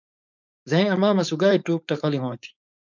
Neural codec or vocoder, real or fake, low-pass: codec, 16 kHz, 4.8 kbps, FACodec; fake; 7.2 kHz